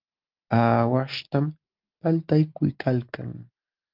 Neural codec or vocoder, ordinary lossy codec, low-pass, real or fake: none; Opus, 32 kbps; 5.4 kHz; real